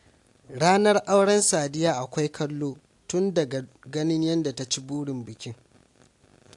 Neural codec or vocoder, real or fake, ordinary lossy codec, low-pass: none; real; AAC, 64 kbps; 10.8 kHz